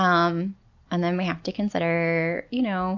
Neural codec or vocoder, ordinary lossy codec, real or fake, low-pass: none; MP3, 48 kbps; real; 7.2 kHz